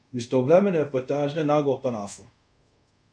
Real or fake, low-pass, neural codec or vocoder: fake; 9.9 kHz; codec, 24 kHz, 0.5 kbps, DualCodec